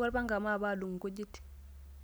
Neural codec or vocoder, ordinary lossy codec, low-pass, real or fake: none; none; none; real